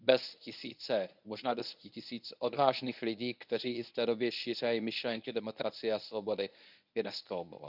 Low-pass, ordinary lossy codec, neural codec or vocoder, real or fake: 5.4 kHz; none; codec, 24 kHz, 0.9 kbps, WavTokenizer, medium speech release version 1; fake